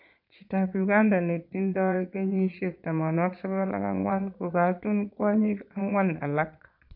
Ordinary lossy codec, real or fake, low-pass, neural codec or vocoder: none; fake; 5.4 kHz; vocoder, 22.05 kHz, 80 mel bands, Vocos